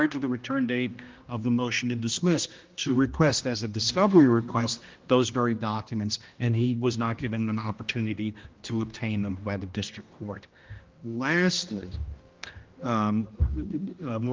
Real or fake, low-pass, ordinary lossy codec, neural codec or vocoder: fake; 7.2 kHz; Opus, 32 kbps; codec, 16 kHz, 1 kbps, X-Codec, HuBERT features, trained on general audio